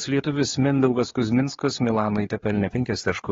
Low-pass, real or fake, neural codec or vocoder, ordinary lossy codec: 7.2 kHz; fake; codec, 16 kHz, 4 kbps, X-Codec, HuBERT features, trained on general audio; AAC, 24 kbps